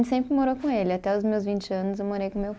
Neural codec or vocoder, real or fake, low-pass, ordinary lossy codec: none; real; none; none